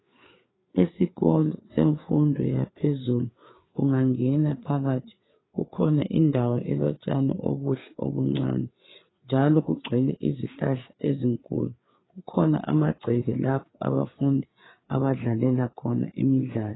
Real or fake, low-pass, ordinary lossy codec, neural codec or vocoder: fake; 7.2 kHz; AAC, 16 kbps; codec, 16 kHz, 4 kbps, FreqCodec, larger model